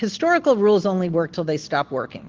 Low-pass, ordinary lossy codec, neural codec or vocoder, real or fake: 7.2 kHz; Opus, 16 kbps; none; real